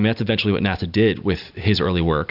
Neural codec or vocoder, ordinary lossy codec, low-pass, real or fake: none; Opus, 64 kbps; 5.4 kHz; real